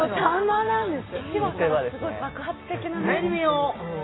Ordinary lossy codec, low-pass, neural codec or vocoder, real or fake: AAC, 16 kbps; 7.2 kHz; none; real